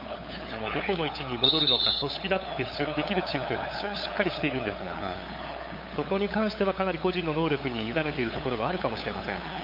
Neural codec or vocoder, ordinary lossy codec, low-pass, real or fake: codec, 16 kHz, 8 kbps, FunCodec, trained on LibriTTS, 25 frames a second; MP3, 32 kbps; 5.4 kHz; fake